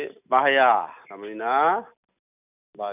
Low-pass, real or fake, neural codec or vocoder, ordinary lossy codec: 3.6 kHz; real; none; none